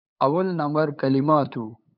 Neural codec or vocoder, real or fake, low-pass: codec, 16 kHz, 8 kbps, FunCodec, trained on LibriTTS, 25 frames a second; fake; 5.4 kHz